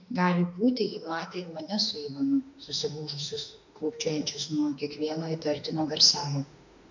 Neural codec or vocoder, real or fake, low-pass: autoencoder, 48 kHz, 32 numbers a frame, DAC-VAE, trained on Japanese speech; fake; 7.2 kHz